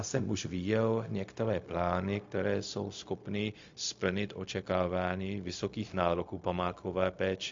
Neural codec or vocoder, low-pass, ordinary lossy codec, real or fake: codec, 16 kHz, 0.4 kbps, LongCat-Audio-Codec; 7.2 kHz; MP3, 48 kbps; fake